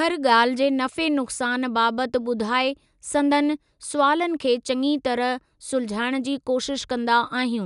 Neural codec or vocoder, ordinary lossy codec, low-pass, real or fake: none; none; 10.8 kHz; real